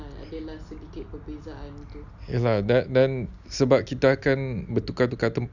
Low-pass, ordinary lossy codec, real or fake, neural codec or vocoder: 7.2 kHz; none; real; none